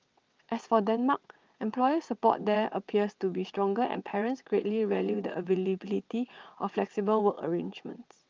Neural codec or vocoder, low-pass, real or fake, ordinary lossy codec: vocoder, 22.05 kHz, 80 mel bands, WaveNeXt; 7.2 kHz; fake; Opus, 24 kbps